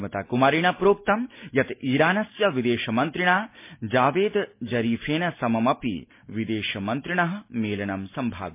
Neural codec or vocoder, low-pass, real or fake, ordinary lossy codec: none; 3.6 kHz; real; MP3, 24 kbps